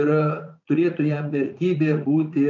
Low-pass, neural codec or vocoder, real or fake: 7.2 kHz; vocoder, 44.1 kHz, 80 mel bands, Vocos; fake